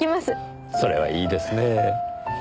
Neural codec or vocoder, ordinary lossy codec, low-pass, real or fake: none; none; none; real